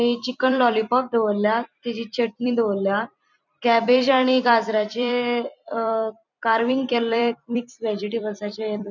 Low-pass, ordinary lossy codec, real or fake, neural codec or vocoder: 7.2 kHz; none; fake; vocoder, 44.1 kHz, 128 mel bands every 256 samples, BigVGAN v2